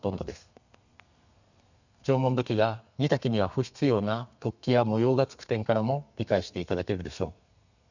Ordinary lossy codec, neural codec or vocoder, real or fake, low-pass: none; codec, 32 kHz, 1.9 kbps, SNAC; fake; 7.2 kHz